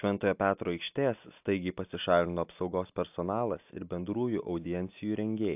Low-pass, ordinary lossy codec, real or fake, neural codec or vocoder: 3.6 kHz; AAC, 32 kbps; real; none